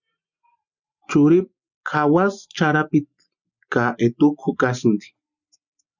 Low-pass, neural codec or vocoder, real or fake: 7.2 kHz; none; real